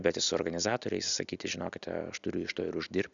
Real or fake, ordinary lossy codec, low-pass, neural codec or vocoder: real; MP3, 96 kbps; 7.2 kHz; none